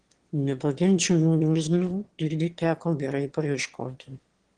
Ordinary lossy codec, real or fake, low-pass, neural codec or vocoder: Opus, 16 kbps; fake; 9.9 kHz; autoencoder, 22.05 kHz, a latent of 192 numbers a frame, VITS, trained on one speaker